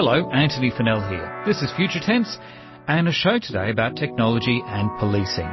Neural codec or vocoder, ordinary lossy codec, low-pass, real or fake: none; MP3, 24 kbps; 7.2 kHz; real